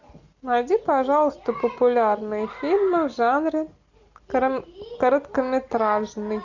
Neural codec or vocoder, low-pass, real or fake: none; 7.2 kHz; real